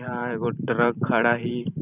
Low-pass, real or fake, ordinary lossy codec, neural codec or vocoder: 3.6 kHz; fake; none; vocoder, 44.1 kHz, 128 mel bands every 512 samples, BigVGAN v2